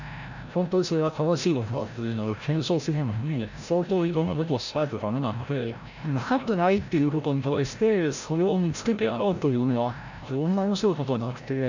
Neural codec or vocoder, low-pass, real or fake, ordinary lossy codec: codec, 16 kHz, 0.5 kbps, FreqCodec, larger model; 7.2 kHz; fake; none